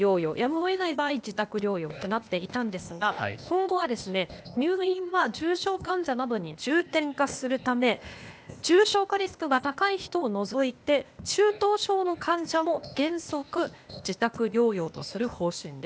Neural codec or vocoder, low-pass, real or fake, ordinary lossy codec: codec, 16 kHz, 0.8 kbps, ZipCodec; none; fake; none